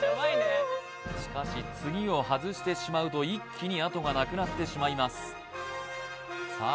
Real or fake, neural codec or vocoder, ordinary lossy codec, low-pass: real; none; none; none